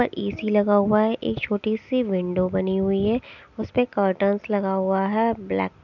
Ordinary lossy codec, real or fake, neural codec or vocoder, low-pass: none; real; none; 7.2 kHz